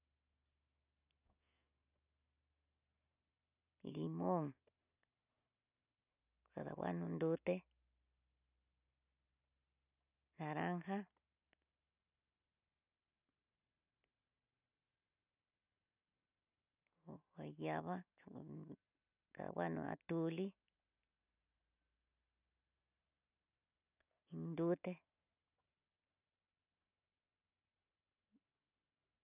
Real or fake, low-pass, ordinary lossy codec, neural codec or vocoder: real; 3.6 kHz; none; none